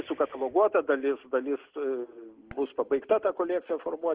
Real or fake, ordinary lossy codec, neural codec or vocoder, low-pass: real; Opus, 16 kbps; none; 3.6 kHz